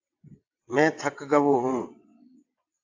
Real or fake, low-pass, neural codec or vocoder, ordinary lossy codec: fake; 7.2 kHz; vocoder, 22.05 kHz, 80 mel bands, WaveNeXt; AAC, 48 kbps